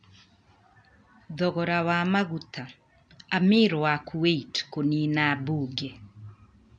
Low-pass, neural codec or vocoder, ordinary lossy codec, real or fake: 9.9 kHz; none; MP3, 64 kbps; real